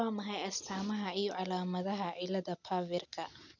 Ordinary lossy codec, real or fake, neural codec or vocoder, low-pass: none; real; none; 7.2 kHz